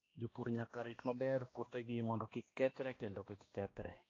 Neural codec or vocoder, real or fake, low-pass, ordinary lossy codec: codec, 16 kHz, 1 kbps, X-Codec, HuBERT features, trained on balanced general audio; fake; 7.2 kHz; AAC, 32 kbps